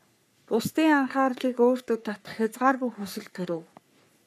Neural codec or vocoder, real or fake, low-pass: codec, 44.1 kHz, 3.4 kbps, Pupu-Codec; fake; 14.4 kHz